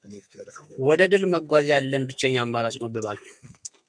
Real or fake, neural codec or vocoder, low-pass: fake; codec, 32 kHz, 1.9 kbps, SNAC; 9.9 kHz